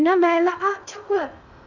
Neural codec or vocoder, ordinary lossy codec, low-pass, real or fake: codec, 16 kHz in and 24 kHz out, 0.6 kbps, FocalCodec, streaming, 2048 codes; none; 7.2 kHz; fake